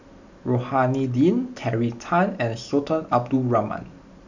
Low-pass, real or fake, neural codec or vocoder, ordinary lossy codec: 7.2 kHz; real; none; none